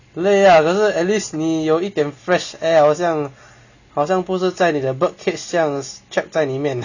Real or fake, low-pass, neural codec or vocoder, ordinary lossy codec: real; 7.2 kHz; none; none